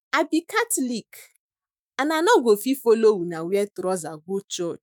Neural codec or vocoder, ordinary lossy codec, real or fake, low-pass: autoencoder, 48 kHz, 128 numbers a frame, DAC-VAE, trained on Japanese speech; none; fake; none